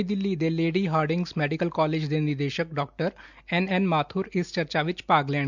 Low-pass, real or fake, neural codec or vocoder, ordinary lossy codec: 7.2 kHz; real; none; none